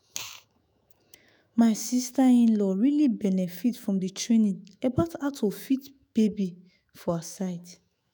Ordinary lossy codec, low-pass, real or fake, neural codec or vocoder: none; none; fake; autoencoder, 48 kHz, 128 numbers a frame, DAC-VAE, trained on Japanese speech